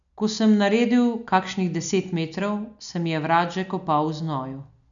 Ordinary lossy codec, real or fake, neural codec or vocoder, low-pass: none; real; none; 7.2 kHz